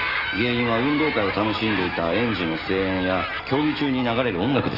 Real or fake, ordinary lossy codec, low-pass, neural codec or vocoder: real; Opus, 24 kbps; 5.4 kHz; none